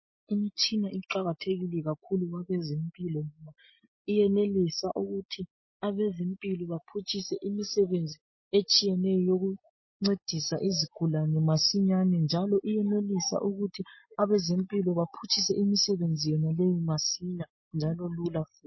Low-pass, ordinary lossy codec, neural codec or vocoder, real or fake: 7.2 kHz; MP3, 24 kbps; none; real